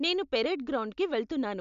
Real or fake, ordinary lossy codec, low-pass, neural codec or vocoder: real; none; 7.2 kHz; none